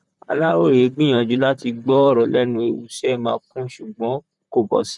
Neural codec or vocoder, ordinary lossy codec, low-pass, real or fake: vocoder, 44.1 kHz, 128 mel bands, Pupu-Vocoder; none; 10.8 kHz; fake